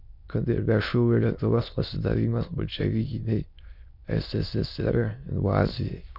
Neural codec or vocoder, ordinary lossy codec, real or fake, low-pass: autoencoder, 22.05 kHz, a latent of 192 numbers a frame, VITS, trained on many speakers; MP3, 48 kbps; fake; 5.4 kHz